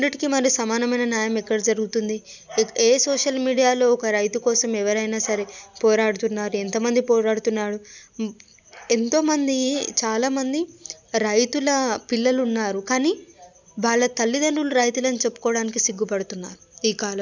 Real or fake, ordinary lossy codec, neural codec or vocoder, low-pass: real; none; none; 7.2 kHz